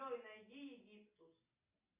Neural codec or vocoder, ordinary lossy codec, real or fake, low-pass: none; Opus, 64 kbps; real; 3.6 kHz